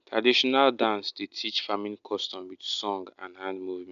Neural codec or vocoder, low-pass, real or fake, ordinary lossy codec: none; 7.2 kHz; real; none